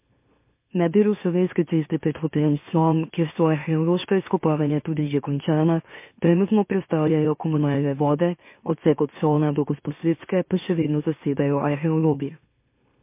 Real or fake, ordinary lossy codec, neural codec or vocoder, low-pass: fake; MP3, 24 kbps; autoencoder, 44.1 kHz, a latent of 192 numbers a frame, MeloTTS; 3.6 kHz